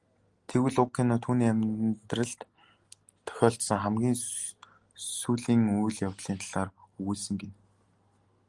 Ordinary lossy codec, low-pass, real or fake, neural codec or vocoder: Opus, 24 kbps; 10.8 kHz; real; none